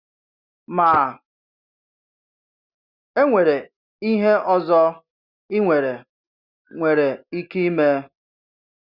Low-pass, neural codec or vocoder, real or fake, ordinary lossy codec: 5.4 kHz; none; real; Opus, 64 kbps